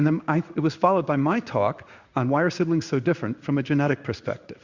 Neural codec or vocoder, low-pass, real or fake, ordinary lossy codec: codec, 16 kHz in and 24 kHz out, 1 kbps, XY-Tokenizer; 7.2 kHz; fake; Opus, 64 kbps